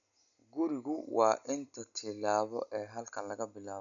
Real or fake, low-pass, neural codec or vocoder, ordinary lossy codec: real; 7.2 kHz; none; none